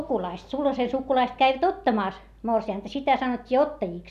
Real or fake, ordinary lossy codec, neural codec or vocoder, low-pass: real; none; none; 14.4 kHz